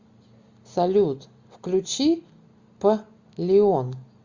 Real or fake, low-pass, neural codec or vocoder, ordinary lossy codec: real; 7.2 kHz; none; Opus, 64 kbps